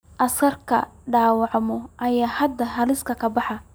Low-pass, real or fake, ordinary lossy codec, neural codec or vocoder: none; real; none; none